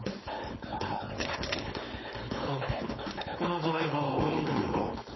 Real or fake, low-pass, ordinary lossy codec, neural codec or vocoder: fake; 7.2 kHz; MP3, 24 kbps; codec, 16 kHz, 4.8 kbps, FACodec